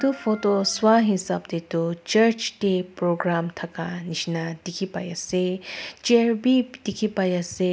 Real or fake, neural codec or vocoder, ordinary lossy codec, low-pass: real; none; none; none